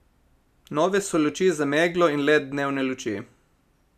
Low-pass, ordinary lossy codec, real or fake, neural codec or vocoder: 14.4 kHz; none; real; none